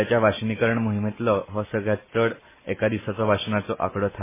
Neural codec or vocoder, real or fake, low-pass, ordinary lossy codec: vocoder, 44.1 kHz, 128 mel bands every 256 samples, BigVGAN v2; fake; 3.6 kHz; MP3, 16 kbps